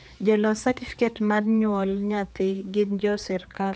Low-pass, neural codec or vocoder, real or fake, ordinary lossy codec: none; codec, 16 kHz, 4 kbps, X-Codec, HuBERT features, trained on general audio; fake; none